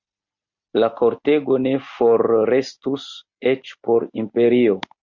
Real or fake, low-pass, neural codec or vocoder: real; 7.2 kHz; none